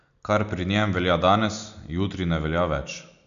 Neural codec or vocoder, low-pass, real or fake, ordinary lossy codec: none; 7.2 kHz; real; none